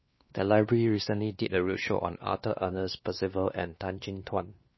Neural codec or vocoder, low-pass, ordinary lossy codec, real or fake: codec, 16 kHz, 2 kbps, X-Codec, WavLM features, trained on Multilingual LibriSpeech; 7.2 kHz; MP3, 24 kbps; fake